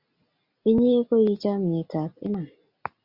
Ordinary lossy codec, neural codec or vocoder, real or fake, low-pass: Opus, 64 kbps; none; real; 5.4 kHz